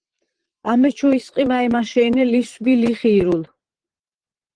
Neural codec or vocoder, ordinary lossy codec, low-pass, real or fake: vocoder, 22.05 kHz, 80 mel bands, Vocos; Opus, 16 kbps; 9.9 kHz; fake